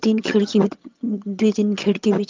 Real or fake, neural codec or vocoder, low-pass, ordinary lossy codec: fake; vocoder, 22.05 kHz, 80 mel bands, HiFi-GAN; 7.2 kHz; Opus, 24 kbps